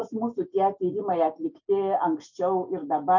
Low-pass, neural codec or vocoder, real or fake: 7.2 kHz; none; real